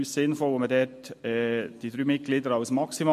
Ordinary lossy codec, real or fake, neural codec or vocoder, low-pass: MP3, 64 kbps; real; none; 14.4 kHz